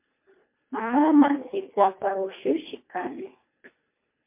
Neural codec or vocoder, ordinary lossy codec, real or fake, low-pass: codec, 24 kHz, 1.5 kbps, HILCodec; MP3, 24 kbps; fake; 3.6 kHz